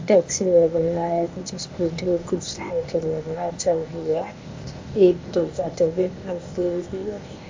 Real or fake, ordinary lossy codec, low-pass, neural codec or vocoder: fake; MP3, 48 kbps; 7.2 kHz; codec, 16 kHz, 0.8 kbps, ZipCodec